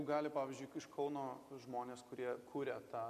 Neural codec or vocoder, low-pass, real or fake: none; 14.4 kHz; real